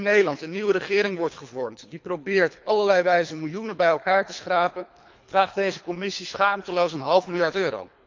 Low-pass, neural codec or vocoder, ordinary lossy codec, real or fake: 7.2 kHz; codec, 24 kHz, 3 kbps, HILCodec; MP3, 64 kbps; fake